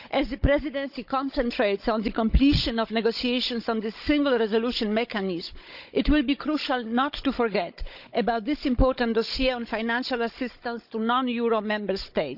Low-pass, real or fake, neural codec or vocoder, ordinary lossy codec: 5.4 kHz; fake; codec, 16 kHz, 16 kbps, FunCodec, trained on Chinese and English, 50 frames a second; none